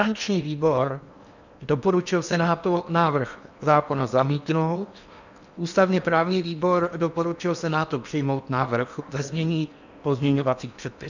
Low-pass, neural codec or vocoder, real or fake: 7.2 kHz; codec, 16 kHz in and 24 kHz out, 0.8 kbps, FocalCodec, streaming, 65536 codes; fake